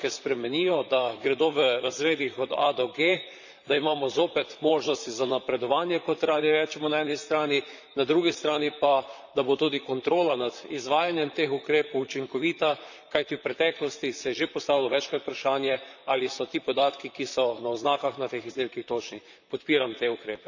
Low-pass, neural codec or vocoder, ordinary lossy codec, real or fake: 7.2 kHz; vocoder, 44.1 kHz, 128 mel bands, Pupu-Vocoder; none; fake